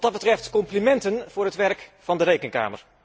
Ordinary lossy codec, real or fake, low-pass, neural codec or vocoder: none; real; none; none